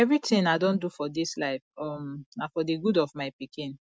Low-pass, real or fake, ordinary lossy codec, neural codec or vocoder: none; real; none; none